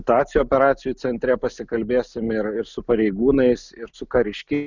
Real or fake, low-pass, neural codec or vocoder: real; 7.2 kHz; none